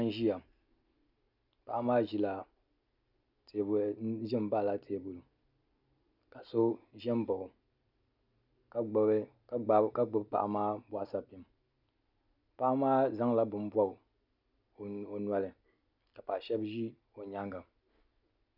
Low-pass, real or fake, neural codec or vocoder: 5.4 kHz; real; none